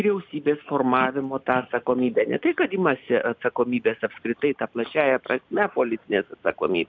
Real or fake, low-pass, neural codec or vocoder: real; 7.2 kHz; none